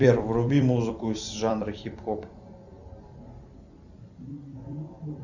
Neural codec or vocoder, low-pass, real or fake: vocoder, 44.1 kHz, 128 mel bands every 256 samples, BigVGAN v2; 7.2 kHz; fake